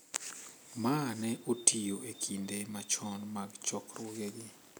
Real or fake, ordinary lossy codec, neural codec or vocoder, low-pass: real; none; none; none